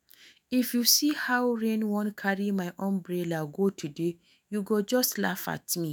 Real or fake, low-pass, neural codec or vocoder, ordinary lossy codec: fake; none; autoencoder, 48 kHz, 128 numbers a frame, DAC-VAE, trained on Japanese speech; none